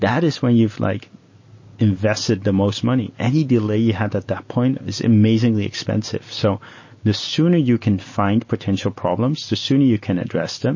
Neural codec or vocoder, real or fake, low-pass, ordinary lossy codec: none; real; 7.2 kHz; MP3, 32 kbps